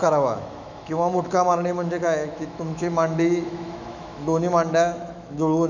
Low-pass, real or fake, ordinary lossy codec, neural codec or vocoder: 7.2 kHz; fake; none; autoencoder, 48 kHz, 128 numbers a frame, DAC-VAE, trained on Japanese speech